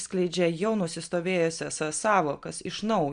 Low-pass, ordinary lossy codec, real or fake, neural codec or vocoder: 9.9 kHz; MP3, 96 kbps; real; none